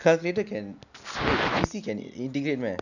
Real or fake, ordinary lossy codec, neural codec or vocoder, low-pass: real; none; none; 7.2 kHz